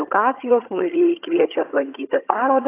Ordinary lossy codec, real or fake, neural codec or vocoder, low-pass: AAC, 24 kbps; fake; vocoder, 22.05 kHz, 80 mel bands, HiFi-GAN; 3.6 kHz